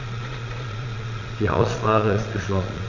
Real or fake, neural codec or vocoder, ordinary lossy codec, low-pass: fake; vocoder, 22.05 kHz, 80 mel bands, Vocos; none; 7.2 kHz